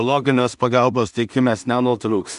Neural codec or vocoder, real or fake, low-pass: codec, 16 kHz in and 24 kHz out, 0.4 kbps, LongCat-Audio-Codec, two codebook decoder; fake; 10.8 kHz